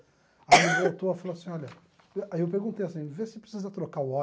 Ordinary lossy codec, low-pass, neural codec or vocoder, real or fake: none; none; none; real